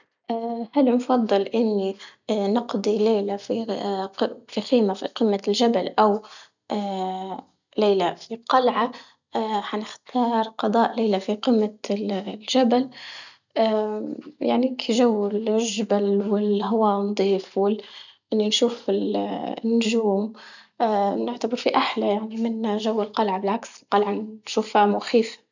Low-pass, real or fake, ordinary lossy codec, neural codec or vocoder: 7.2 kHz; real; none; none